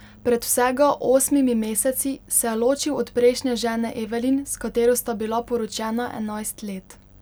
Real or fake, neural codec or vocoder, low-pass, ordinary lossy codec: real; none; none; none